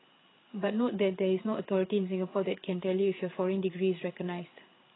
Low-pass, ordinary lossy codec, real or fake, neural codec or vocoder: 7.2 kHz; AAC, 16 kbps; fake; codec, 16 kHz, 8 kbps, FreqCodec, larger model